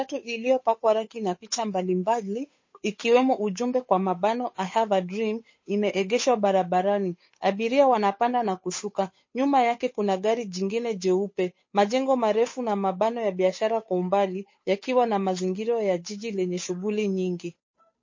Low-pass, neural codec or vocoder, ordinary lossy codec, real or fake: 7.2 kHz; codec, 16 kHz, 8 kbps, FunCodec, trained on Chinese and English, 25 frames a second; MP3, 32 kbps; fake